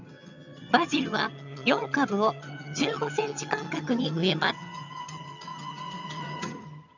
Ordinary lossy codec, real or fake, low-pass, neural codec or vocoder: none; fake; 7.2 kHz; vocoder, 22.05 kHz, 80 mel bands, HiFi-GAN